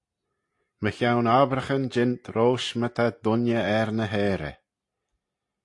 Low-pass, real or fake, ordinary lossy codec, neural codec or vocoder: 10.8 kHz; real; AAC, 48 kbps; none